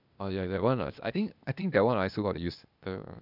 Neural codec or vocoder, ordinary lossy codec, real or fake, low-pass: codec, 16 kHz, 0.8 kbps, ZipCodec; none; fake; 5.4 kHz